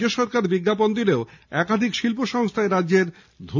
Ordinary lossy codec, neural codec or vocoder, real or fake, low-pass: none; none; real; 7.2 kHz